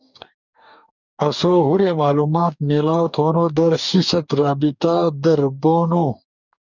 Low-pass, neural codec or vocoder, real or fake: 7.2 kHz; codec, 44.1 kHz, 2.6 kbps, DAC; fake